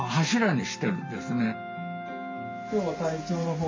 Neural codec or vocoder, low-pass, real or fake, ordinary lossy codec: none; 7.2 kHz; real; MP3, 48 kbps